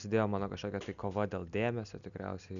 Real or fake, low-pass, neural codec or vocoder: real; 7.2 kHz; none